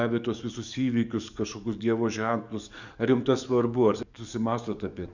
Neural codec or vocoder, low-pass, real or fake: codec, 44.1 kHz, 7.8 kbps, DAC; 7.2 kHz; fake